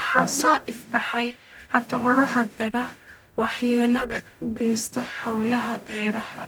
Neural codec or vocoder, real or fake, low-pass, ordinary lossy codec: codec, 44.1 kHz, 0.9 kbps, DAC; fake; none; none